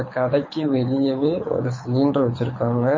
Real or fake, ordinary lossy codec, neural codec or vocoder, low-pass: fake; MP3, 32 kbps; codec, 24 kHz, 6 kbps, HILCodec; 7.2 kHz